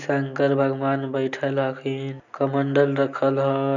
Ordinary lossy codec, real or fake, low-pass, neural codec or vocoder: none; real; 7.2 kHz; none